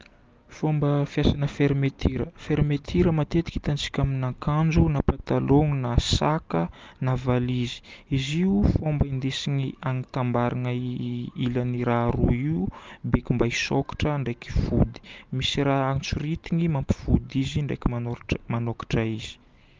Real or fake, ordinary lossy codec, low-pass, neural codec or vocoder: real; Opus, 24 kbps; 7.2 kHz; none